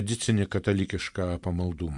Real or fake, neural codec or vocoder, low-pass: fake; vocoder, 44.1 kHz, 128 mel bands every 512 samples, BigVGAN v2; 10.8 kHz